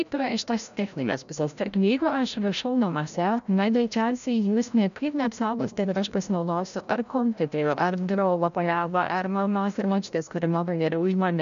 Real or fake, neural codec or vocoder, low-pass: fake; codec, 16 kHz, 0.5 kbps, FreqCodec, larger model; 7.2 kHz